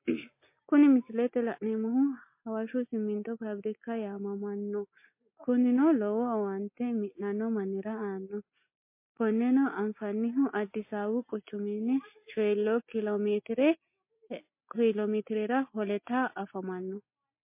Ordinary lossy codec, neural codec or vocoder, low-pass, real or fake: MP3, 24 kbps; none; 3.6 kHz; real